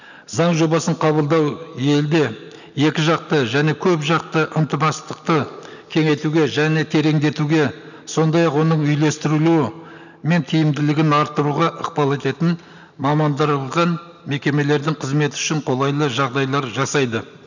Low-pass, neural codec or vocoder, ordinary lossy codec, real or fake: 7.2 kHz; none; none; real